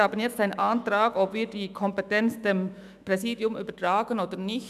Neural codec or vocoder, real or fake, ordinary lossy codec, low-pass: autoencoder, 48 kHz, 128 numbers a frame, DAC-VAE, trained on Japanese speech; fake; none; 14.4 kHz